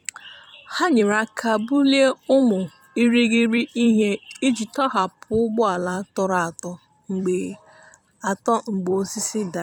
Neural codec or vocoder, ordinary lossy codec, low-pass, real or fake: vocoder, 44.1 kHz, 128 mel bands every 256 samples, BigVGAN v2; none; 19.8 kHz; fake